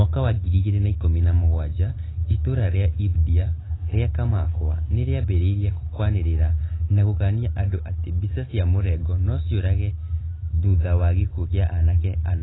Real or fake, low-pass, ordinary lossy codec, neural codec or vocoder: real; 7.2 kHz; AAC, 16 kbps; none